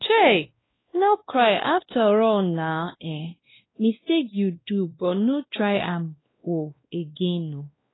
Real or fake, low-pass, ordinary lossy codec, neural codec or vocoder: fake; 7.2 kHz; AAC, 16 kbps; codec, 16 kHz, 1 kbps, X-Codec, WavLM features, trained on Multilingual LibriSpeech